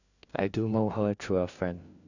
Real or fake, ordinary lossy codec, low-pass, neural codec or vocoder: fake; none; 7.2 kHz; codec, 16 kHz, 1 kbps, FunCodec, trained on LibriTTS, 50 frames a second